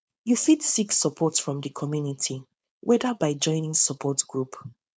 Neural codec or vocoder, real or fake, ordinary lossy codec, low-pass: codec, 16 kHz, 4.8 kbps, FACodec; fake; none; none